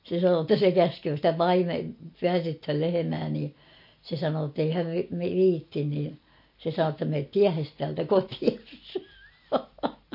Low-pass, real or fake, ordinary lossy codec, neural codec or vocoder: 5.4 kHz; real; MP3, 32 kbps; none